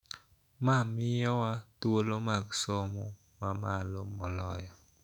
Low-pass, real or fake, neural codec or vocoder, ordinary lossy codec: 19.8 kHz; fake; autoencoder, 48 kHz, 128 numbers a frame, DAC-VAE, trained on Japanese speech; none